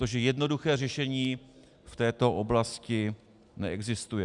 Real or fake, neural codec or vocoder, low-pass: real; none; 10.8 kHz